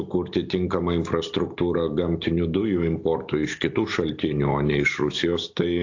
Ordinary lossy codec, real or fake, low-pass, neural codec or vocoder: AAC, 48 kbps; real; 7.2 kHz; none